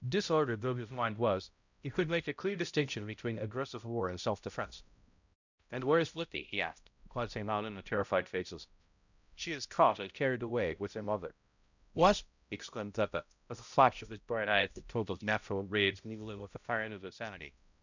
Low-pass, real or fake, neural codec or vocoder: 7.2 kHz; fake; codec, 16 kHz, 0.5 kbps, X-Codec, HuBERT features, trained on balanced general audio